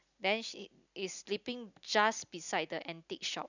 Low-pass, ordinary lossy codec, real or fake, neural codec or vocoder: 7.2 kHz; none; real; none